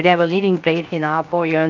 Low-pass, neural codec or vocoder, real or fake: 7.2 kHz; codec, 16 kHz, 0.7 kbps, FocalCodec; fake